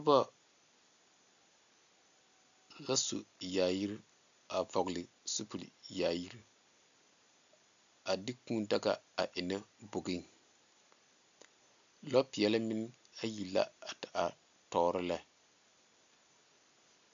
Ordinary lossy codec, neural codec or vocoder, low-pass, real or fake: MP3, 64 kbps; none; 7.2 kHz; real